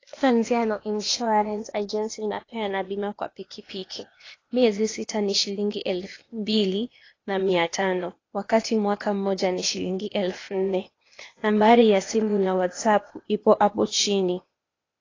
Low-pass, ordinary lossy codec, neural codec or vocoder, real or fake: 7.2 kHz; AAC, 32 kbps; codec, 16 kHz, 0.8 kbps, ZipCodec; fake